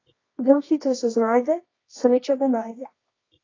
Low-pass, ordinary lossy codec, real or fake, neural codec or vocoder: 7.2 kHz; AAC, 32 kbps; fake; codec, 24 kHz, 0.9 kbps, WavTokenizer, medium music audio release